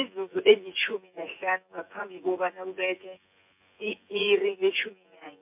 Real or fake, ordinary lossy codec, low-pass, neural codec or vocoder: fake; MP3, 32 kbps; 3.6 kHz; vocoder, 24 kHz, 100 mel bands, Vocos